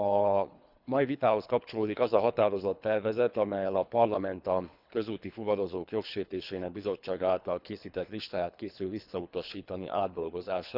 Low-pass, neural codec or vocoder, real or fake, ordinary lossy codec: 5.4 kHz; codec, 24 kHz, 3 kbps, HILCodec; fake; none